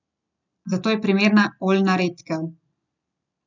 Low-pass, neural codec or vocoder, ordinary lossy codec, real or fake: 7.2 kHz; none; none; real